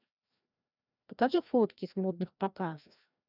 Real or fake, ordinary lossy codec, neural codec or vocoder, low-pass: fake; none; codec, 16 kHz, 1 kbps, FreqCodec, larger model; 5.4 kHz